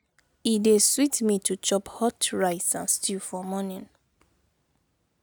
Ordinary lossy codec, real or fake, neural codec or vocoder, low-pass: none; real; none; none